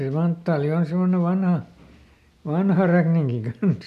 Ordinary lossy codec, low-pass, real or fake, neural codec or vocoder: none; 14.4 kHz; real; none